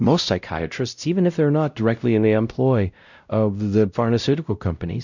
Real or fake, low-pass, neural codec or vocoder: fake; 7.2 kHz; codec, 16 kHz, 0.5 kbps, X-Codec, WavLM features, trained on Multilingual LibriSpeech